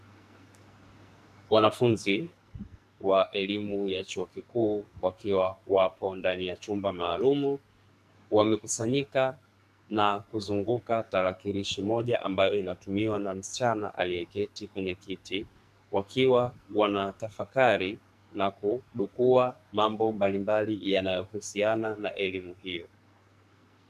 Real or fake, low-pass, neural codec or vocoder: fake; 14.4 kHz; codec, 44.1 kHz, 2.6 kbps, SNAC